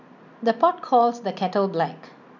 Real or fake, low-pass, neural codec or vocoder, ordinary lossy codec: real; 7.2 kHz; none; none